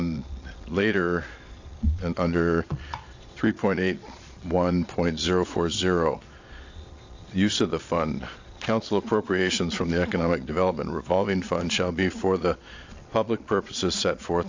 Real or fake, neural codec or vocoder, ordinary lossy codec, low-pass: fake; vocoder, 22.05 kHz, 80 mel bands, Vocos; AAC, 48 kbps; 7.2 kHz